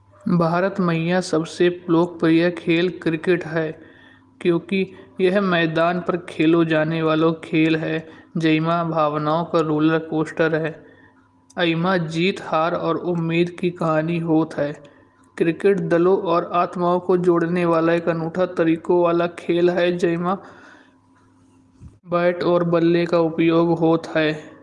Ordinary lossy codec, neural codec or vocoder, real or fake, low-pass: Opus, 24 kbps; none; real; 10.8 kHz